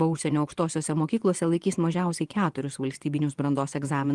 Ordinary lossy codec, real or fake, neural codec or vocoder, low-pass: Opus, 24 kbps; fake; vocoder, 24 kHz, 100 mel bands, Vocos; 10.8 kHz